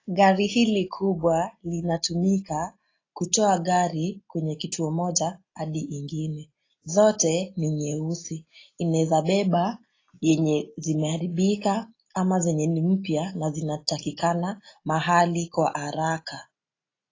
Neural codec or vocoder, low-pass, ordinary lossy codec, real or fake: none; 7.2 kHz; AAC, 32 kbps; real